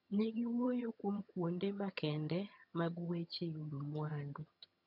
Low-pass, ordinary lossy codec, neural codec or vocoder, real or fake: 5.4 kHz; none; vocoder, 22.05 kHz, 80 mel bands, HiFi-GAN; fake